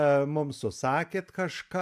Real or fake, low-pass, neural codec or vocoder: real; 14.4 kHz; none